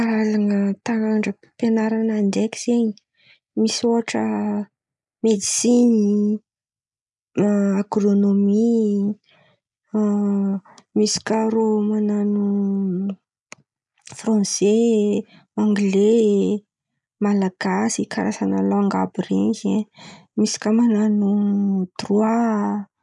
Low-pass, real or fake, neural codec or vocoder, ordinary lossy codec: 10.8 kHz; real; none; none